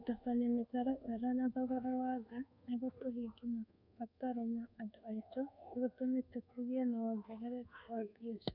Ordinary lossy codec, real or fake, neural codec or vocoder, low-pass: none; fake; codec, 24 kHz, 1.2 kbps, DualCodec; 5.4 kHz